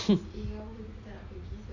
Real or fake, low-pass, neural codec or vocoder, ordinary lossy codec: real; 7.2 kHz; none; none